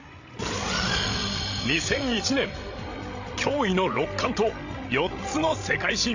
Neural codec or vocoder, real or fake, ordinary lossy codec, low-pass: codec, 16 kHz, 16 kbps, FreqCodec, larger model; fake; AAC, 48 kbps; 7.2 kHz